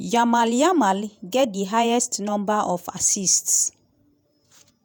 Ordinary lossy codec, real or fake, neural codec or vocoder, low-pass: none; fake; vocoder, 48 kHz, 128 mel bands, Vocos; none